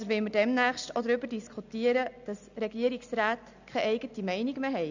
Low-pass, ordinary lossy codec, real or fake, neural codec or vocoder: 7.2 kHz; none; real; none